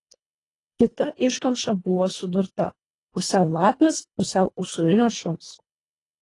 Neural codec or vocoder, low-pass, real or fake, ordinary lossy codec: codec, 24 kHz, 1.5 kbps, HILCodec; 10.8 kHz; fake; AAC, 32 kbps